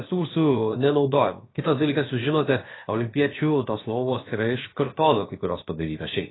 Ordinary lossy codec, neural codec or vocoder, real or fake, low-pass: AAC, 16 kbps; codec, 16 kHz, about 1 kbps, DyCAST, with the encoder's durations; fake; 7.2 kHz